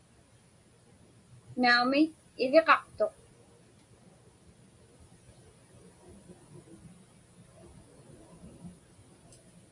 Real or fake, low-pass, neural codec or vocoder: real; 10.8 kHz; none